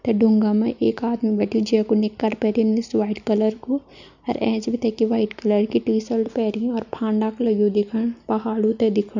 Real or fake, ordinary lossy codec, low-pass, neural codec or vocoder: real; none; 7.2 kHz; none